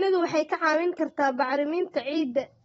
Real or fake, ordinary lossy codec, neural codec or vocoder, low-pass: fake; AAC, 24 kbps; vocoder, 44.1 kHz, 128 mel bands every 256 samples, BigVGAN v2; 19.8 kHz